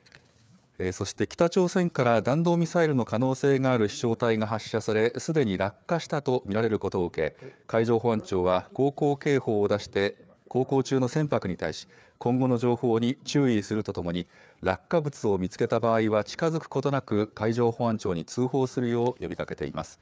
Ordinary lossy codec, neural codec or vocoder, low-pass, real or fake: none; codec, 16 kHz, 4 kbps, FreqCodec, larger model; none; fake